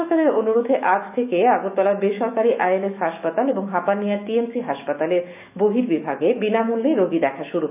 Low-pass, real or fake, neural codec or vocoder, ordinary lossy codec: 3.6 kHz; fake; autoencoder, 48 kHz, 128 numbers a frame, DAC-VAE, trained on Japanese speech; none